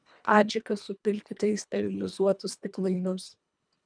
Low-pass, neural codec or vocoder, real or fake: 9.9 kHz; codec, 24 kHz, 1.5 kbps, HILCodec; fake